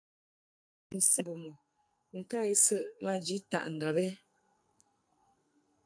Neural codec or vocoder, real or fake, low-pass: codec, 44.1 kHz, 2.6 kbps, SNAC; fake; 9.9 kHz